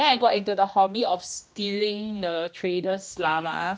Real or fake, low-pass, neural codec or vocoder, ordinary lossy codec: fake; none; codec, 16 kHz, 1 kbps, X-Codec, HuBERT features, trained on general audio; none